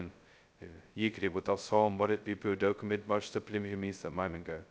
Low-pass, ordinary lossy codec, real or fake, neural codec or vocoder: none; none; fake; codec, 16 kHz, 0.2 kbps, FocalCodec